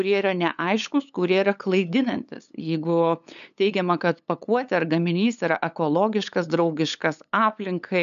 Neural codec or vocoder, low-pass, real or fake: codec, 16 kHz, 8 kbps, FunCodec, trained on LibriTTS, 25 frames a second; 7.2 kHz; fake